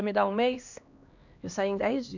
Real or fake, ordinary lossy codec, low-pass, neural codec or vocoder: fake; none; 7.2 kHz; codec, 16 kHz, 2 kbps, X-Codec, HuBERT features, trained on LibriSpeech